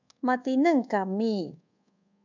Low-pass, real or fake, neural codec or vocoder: 7.2 kHz; fake; codec, 24 kHz, 1.2 kbps, DualCodec